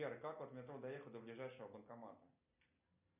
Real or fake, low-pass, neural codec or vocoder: real; 3.6 kHz; none